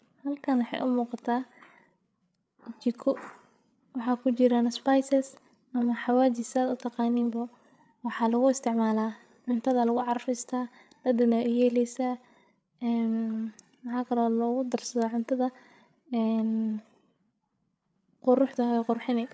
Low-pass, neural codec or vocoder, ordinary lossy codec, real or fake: none; codec, 16 kHz, 8 kbps, FreqCodec, larger model; none; fake